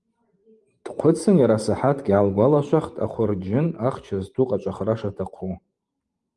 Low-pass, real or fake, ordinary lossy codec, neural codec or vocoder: 10.8 kHz; fake; Opus, 32 kbps; vocoder, 44.1 kHz, 128 mel bands every 512 samples, BigVGAN v2